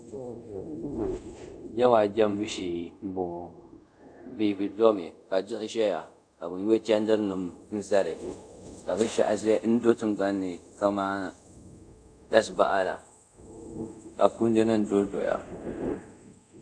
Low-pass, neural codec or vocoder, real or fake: 9.9 kHz; codec, 24 kHz, 0.5 kbps, DualCodec; fake